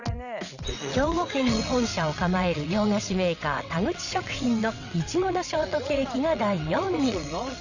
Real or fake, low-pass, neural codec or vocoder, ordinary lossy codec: fake; 7.2 kHz; vocoder, 22.05 kHz, 80 mel bands, WaveNeXt; none